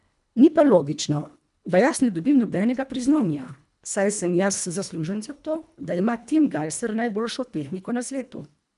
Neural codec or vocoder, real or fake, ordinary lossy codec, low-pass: codec, 24 kHz, 1.5 kbps, HILCodec; fake; none; 10.8 kHz